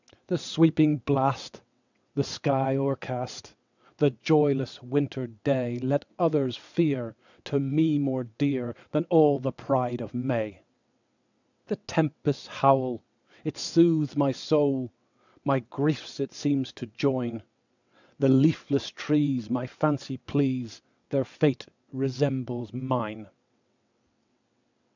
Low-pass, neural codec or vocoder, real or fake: 7.2 kHz; vocoder, 22.05 kHz, 80 mel bands, WaveNeXt; fake